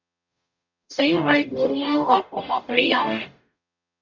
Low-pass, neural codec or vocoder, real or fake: 7.2 kHz; codec, 44.1 kHz, 0.9 kbps, DAC; fake